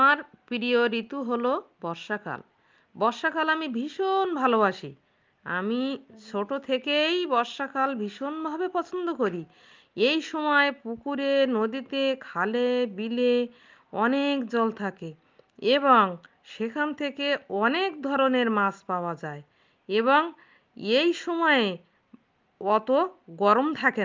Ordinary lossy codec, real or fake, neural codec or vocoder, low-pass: Opus, 24 kbps; real; none; 7.2 kHz